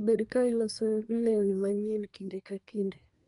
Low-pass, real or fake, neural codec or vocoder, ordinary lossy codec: 10.8 kHz; fake; codec, 24 kHz, 1 kbps, SNAC; MP3, 96 kbps